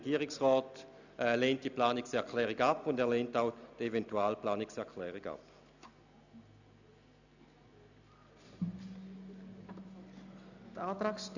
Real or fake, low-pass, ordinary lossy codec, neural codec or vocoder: real; 7.2 kHz; none; none